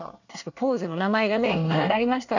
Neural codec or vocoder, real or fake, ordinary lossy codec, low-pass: codec, 24 kHz, 1 kbps, SNAC; fake; none; 7.2 kHz